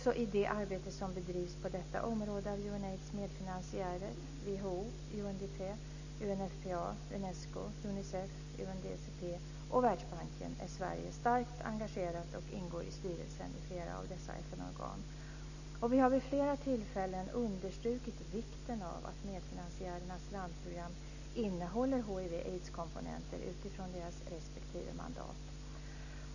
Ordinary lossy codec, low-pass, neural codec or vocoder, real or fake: none; 7.2 kHz; none; real